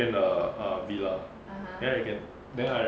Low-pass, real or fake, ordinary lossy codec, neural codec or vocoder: none; real; none; none